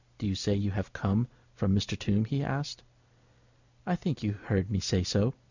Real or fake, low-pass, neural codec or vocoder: real; 7.2 kHz; none